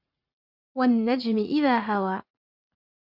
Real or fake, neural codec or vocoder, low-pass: fake; vocoder, 44.1 kHz, 80 mel bands, Vocos; 5.4 kHz